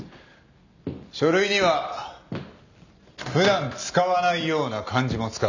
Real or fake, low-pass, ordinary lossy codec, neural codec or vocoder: real; 7.2 kHz; none; none